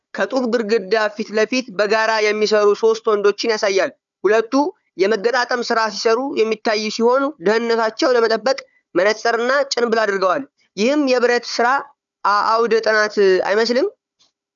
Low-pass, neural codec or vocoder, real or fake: 7.2 kHz; codec, 16 kHz, 4 kbps, FunCodec, trained on Chinese and English, 50 frames a second; fake